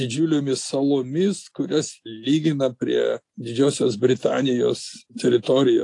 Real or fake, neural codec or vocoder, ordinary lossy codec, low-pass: real; none; AAC, 48 kbps; 10.8 kHz